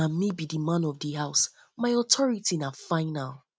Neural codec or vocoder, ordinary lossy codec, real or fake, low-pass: none; none; real; none